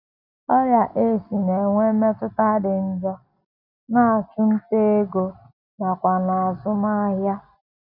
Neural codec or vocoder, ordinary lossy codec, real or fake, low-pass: none; none; real; 5.4 kHz